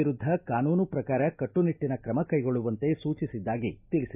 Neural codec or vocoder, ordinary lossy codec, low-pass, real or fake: none; none; 3.6 kHz; real